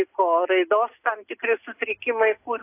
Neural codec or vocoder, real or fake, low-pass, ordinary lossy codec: none; real; 3.6 kHz; AAC, 24 kbps